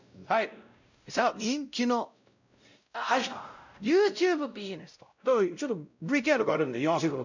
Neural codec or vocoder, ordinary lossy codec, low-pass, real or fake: codec, 16 kHz, 0.5 kbps, X-Codec, WavLM features, trained on Multilingual LibriSpeech; none; 7.2 kHz; fake